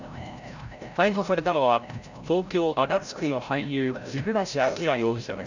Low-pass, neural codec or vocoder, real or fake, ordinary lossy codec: 7.2 kHz; codec, 16 kHz, 0.5 kbps, FreqCodec, larger model; fake; none